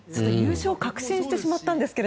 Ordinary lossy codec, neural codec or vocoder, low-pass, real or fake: none; none; none; real